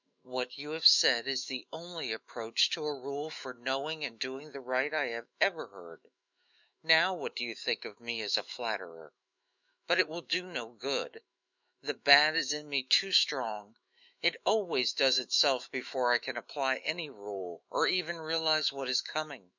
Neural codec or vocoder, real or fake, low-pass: autoencoder, 48 kHz, 128 numbers a frame, DAC-VAE, trained on Japanese speech; fake; 7.2 kHz